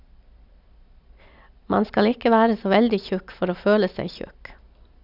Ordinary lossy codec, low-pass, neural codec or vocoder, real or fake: none; 5.4 kHz; none; real